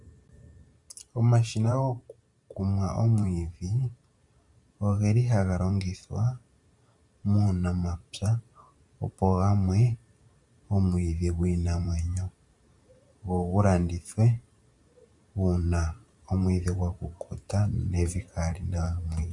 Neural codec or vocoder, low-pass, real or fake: vocoder, 44.1 kHz, 128 mel bands every 512 samples, BigVGAN v2; 10.8 kHz; fake